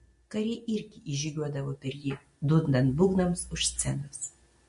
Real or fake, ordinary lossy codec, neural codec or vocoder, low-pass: real; MP3, 48 kbps; none; 10.8 kHz